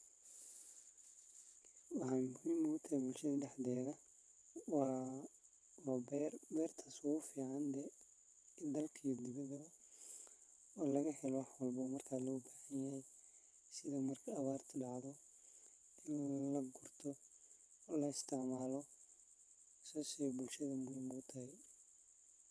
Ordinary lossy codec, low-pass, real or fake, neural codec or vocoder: none; none; fake; vocoder, 22.05 kHz, 80 mel bands, WaveNeXt